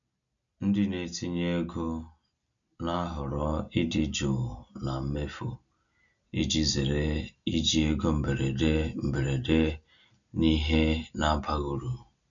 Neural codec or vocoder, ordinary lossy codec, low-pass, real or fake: none; none; 7.2 kHz; real